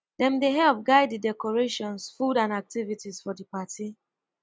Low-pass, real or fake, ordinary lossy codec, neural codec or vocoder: none; real; none; none